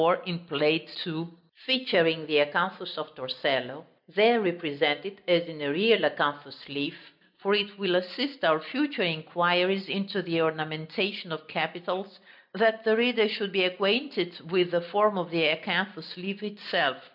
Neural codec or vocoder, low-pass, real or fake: none; 5.4 kHz; real